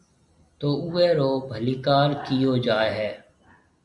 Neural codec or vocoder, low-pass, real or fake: none; 10.8 kHz; real